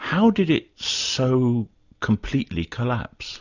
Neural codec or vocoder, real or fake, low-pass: none; real; 7.2 kHz